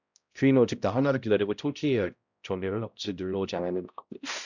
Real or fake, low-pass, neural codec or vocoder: fake; 7.2 kHz; codec, 16 kHz, 0.5 kbps, X-Codec, HuBERT features, trained on balanced general audio